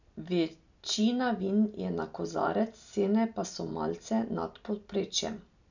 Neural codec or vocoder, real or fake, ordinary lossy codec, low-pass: none; real; none; 7.2 kHz